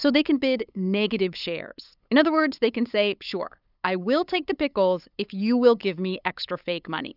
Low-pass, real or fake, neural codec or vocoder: 5.4 kHz; fake; codec, 16 kHz, 8 kbps, FunCodec, trained on LibriTTS, 25 frames a second